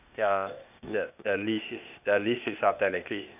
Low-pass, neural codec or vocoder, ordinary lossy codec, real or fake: 3.6 kHz; codec, 16 kHz, 0.8 kbps, ZipCodec; none; fake